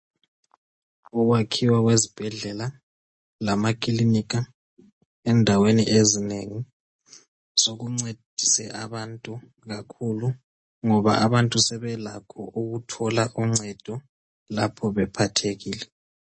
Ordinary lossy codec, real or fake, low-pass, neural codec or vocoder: MP3, 32 kbps; real; 9.9 kHz; none